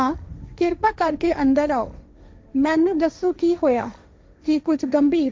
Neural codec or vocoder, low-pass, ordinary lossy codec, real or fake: codec, 16 kHz, 1.1 kbps, Voila-Tokenizer; none; none; fake